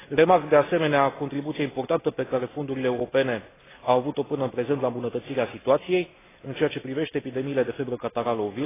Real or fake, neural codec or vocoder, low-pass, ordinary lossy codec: real; none; 3.6 kHz; AAC, 16 kbps